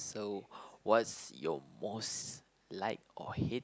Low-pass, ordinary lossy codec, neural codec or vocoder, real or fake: none; none; none; real